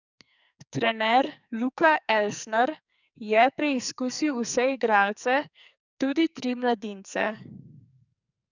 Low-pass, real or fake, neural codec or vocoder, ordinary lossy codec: 7.2 kHz; fake; codec, 44.1 kHz, 2.6 kbps, SNAC; none